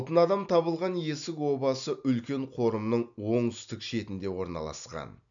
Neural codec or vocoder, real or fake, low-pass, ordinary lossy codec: none; real; 7.2 kHz; none